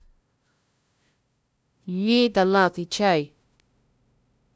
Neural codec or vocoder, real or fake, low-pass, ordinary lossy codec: codec, 16 kHz, 0.5 kbps, FunCodec, trained on LibriTTS, 25 frames a second; fake; none; none